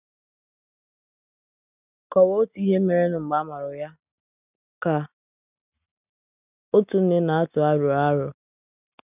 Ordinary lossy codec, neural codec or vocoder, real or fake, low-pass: none; none; real; 3.6 kHz